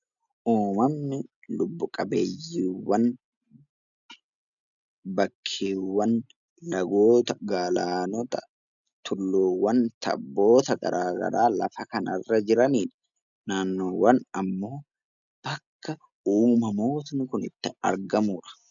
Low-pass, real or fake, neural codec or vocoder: 7.2 kHz; real; none